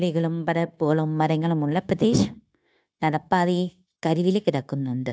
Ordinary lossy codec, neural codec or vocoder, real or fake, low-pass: none; codec, 16 kHz, 0.9 kbps, LongCat-Audio-Codec; fake; none